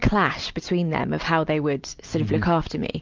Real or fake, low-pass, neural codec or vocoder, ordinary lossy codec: real; 7.2 kHz; none; Opus, 24 kbps